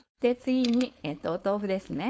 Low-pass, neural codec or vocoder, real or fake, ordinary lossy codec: none; codec, 16 kHz, 4.8 kbps, FACodec; fake; none